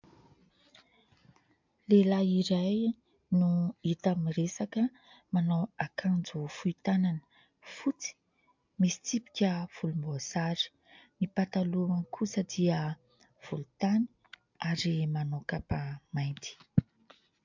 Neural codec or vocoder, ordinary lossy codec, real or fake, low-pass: none; MP3, 64 kbps; real; 7.2 kHz